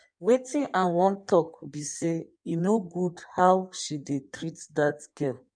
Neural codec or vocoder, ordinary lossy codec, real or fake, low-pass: codec, 16 kHz in and 24 kHz out, 1.1 kbps, FireRedTTS-2 codec; none; fake; 9.9 kHz